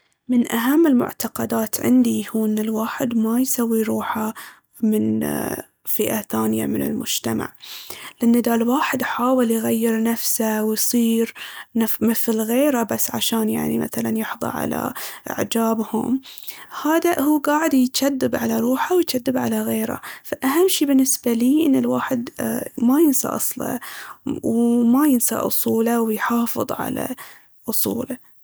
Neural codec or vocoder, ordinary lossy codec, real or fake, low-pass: none; none; real; none